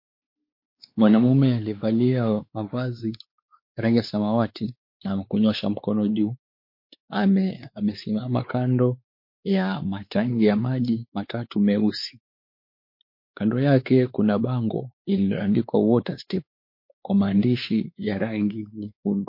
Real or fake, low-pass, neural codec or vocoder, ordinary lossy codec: fake; 5.4 kHz; codec, 16 kHz, 4 kbps, X-Codec, WavLM features, trained on Multilingual LibriSpeech; MP3, 32 kbps